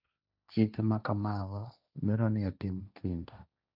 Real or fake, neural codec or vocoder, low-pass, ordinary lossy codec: fake; codec, 16 kHz, 1.1 kbps, Voila-Tokenizer; 5.4 kHz; none